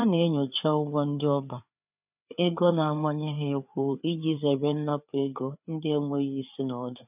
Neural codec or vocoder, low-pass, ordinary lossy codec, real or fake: codec, 16 kHz, 4 kbps, FreqCodec, larger model; 3.6 kHz; none; fake